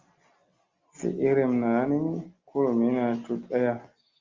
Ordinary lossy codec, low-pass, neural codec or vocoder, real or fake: Opus, 32 kbps; 7.2 kHz; none; real